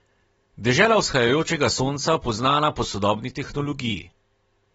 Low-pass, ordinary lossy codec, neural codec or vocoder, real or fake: 19.8 kHz; AAC, 24 kbps; none; real